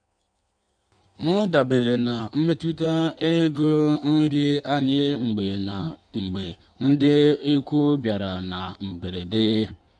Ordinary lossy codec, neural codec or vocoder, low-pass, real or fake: none; codec, 16 kHz in and 24 kHz out, 1.1 kbps, FireRedTTS-2 codec; 9.9 kHz; fake